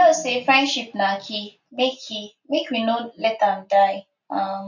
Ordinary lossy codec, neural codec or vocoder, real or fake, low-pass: none; none; real; 7.2 kHz